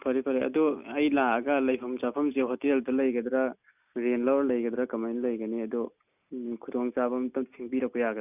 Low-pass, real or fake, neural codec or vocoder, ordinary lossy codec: 3.6 kHz; real; none; none